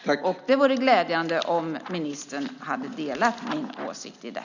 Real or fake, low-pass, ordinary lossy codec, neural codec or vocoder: real; 7.2 kHz; none; none